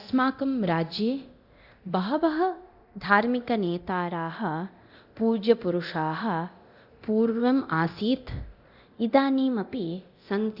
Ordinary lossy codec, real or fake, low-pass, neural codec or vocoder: Opus, 64 kbps; fake; 5.4 kHz; codec, 24 kHz, 0.9 kbps, DualCodec